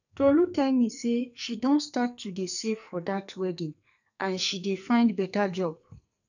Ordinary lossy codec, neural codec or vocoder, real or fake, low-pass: none; codec, 44.1 kHz, 2.6 kbps, SNAC; fake; 7.2 kHz